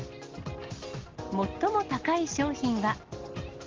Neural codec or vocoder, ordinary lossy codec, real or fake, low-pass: none; Opus, 16 kbps; real; 7.2 kHz